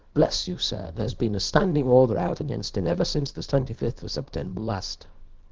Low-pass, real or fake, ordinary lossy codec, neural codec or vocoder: 7.2 kHz; fake; Opus, 16 kbps; codec, 24 kHz, 0.9 kbps, WavTokenizer, small release